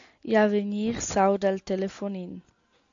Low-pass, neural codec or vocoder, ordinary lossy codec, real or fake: 7.2 kHz; none; AAC, 64 kbps; real